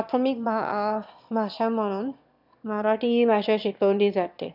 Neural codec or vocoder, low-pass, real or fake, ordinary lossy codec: autoencoder, 22.05 kHz, a latent of 192 numbers a frame, VITS, trained on one speaker; 5.4 kHz; fake; none